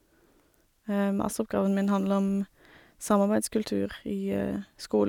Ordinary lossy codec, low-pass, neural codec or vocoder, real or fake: none; 19.8 kHz; none; real